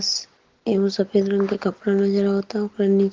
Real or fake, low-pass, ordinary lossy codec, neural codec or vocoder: real; 7.2 kHz; Opus, 16 kbps; none